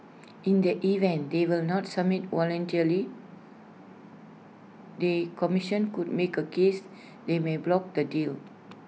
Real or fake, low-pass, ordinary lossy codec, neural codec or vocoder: real; none; none; none